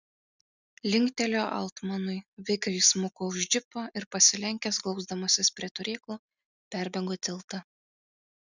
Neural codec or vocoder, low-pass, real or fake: none; 7.2 kHz; real